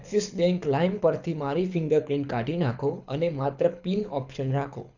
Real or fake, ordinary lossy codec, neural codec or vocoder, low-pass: fake; none; codec, 24 kHz, 6 kbps, HILCodec; 7.2 kHz